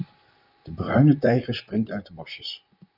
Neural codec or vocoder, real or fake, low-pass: vocoder, 44.1 kHz, 128 mel bands, Pupu-Vocoder; fake; 5.4 kHz